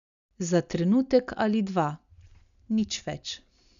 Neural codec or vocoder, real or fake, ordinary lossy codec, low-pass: none; real; none; 7.2 kHz